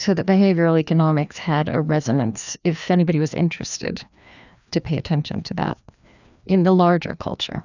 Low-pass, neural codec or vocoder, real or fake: 7.2 kHz; codec, 16 kHz, 2 kbps, FreqCodec, larger model; fake